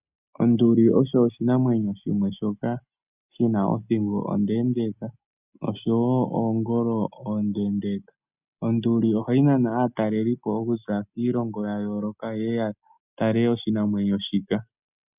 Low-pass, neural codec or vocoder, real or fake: 3.6 kHz; none; real